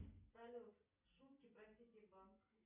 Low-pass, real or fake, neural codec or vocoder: 3.6 kHz; real; none